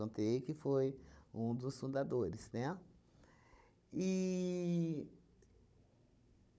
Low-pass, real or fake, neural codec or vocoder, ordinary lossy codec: none; fake; codec, 16 kHz, 16 kbps, FunCodec, trained on Chinese and English, 50 frames a second; none